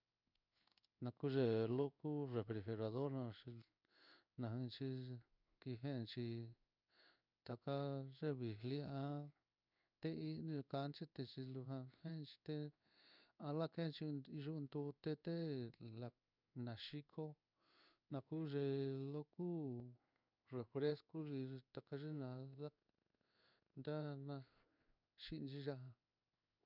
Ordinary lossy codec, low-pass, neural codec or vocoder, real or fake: none; 5.4 kHz; codec, 16 kHz in and 24 kHz out, 1 kbps, XY-Tokenizer; fake